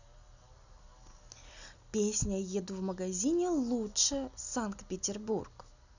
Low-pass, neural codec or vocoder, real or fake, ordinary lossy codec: 7.2 kHz; none; real; none